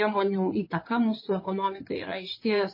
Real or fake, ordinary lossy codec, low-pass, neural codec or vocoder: fake; MP3, 24 kbps; 5.4 kHz; codec, 16 kHz in and 24 kHz out, 2.2 kbps, FireRedTTS-2 codec